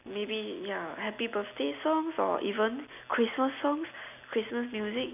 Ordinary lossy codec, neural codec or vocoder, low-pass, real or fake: none; none; 3.6 kHz; real